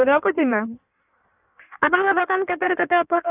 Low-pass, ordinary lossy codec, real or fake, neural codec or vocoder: 3.6 kHz; none; fake; codec, 16 kHz in and 24 kHz out, 1.1 kbps, FireRedTTS-2 codec